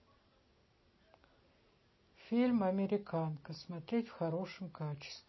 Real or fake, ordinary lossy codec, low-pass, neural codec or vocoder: real; MP3, 24 kbps; 7.2 kHz; none